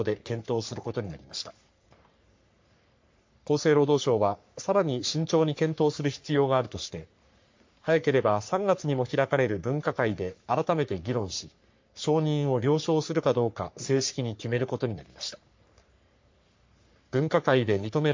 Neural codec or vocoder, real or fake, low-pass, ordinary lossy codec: codec, 44.1 kHz, 3.4 kbps, Pupu-Codec; fake; 7.2 kHz; MP3, 48 kbps